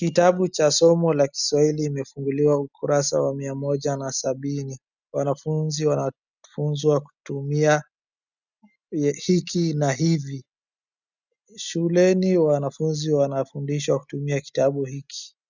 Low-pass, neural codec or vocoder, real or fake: 7.2 kHz; none; real